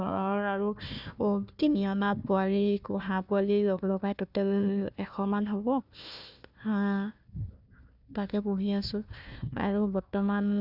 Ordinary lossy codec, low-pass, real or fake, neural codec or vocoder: none; 5.4 kHz; fake; codec, 16 kHz, 1 kbps, FunCodec, trained on Chinese and English, 50 frames a second